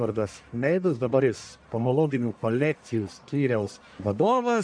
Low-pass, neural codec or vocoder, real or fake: 9.9 kHz; codec, 44.1 kHz, 1.7 kbps, Pupu-Codec; fake